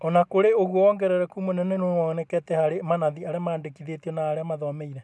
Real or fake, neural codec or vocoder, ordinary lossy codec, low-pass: real; none; none; none